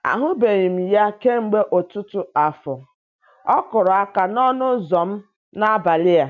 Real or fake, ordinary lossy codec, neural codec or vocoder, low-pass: real; none; none; 7.2 kHz